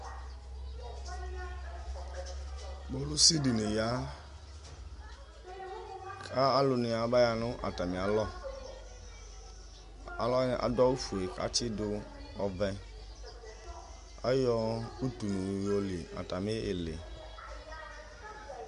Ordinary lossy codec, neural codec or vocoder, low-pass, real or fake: MP3, 64 kbps; none; 10.8 kHz; real